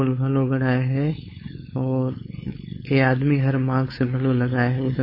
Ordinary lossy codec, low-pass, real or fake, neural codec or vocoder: MP3, 24 kbps; 5.4 kHz; fake; codec, 16 kHz, 4.8 kbps, FACodec